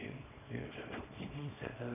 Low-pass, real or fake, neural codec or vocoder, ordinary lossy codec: 3.6 kHz; fake; codec, 24 kHz, 0.9 kbps, WavTokenizer, small release; none